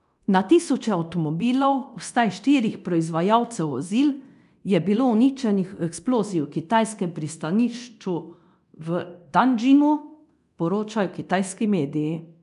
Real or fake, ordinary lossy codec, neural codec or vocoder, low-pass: fake; none; codec, 24 kHz, 0.9 kbps, DualCodec; 10.8 kHz